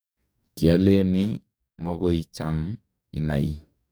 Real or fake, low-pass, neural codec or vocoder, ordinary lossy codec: fake; none; codec, 44.1 kHz, 2.6 kbps, DAC; none